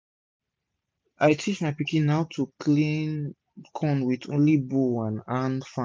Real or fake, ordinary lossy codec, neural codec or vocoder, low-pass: real; none; none; none